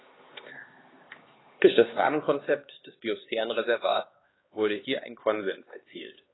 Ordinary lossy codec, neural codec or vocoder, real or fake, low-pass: AAC, 16 kbps; codec, 16 kHz, 2 kbps, X-Codec, HuBERT features, trained on LibriSpeech; fake; 7.2 kHz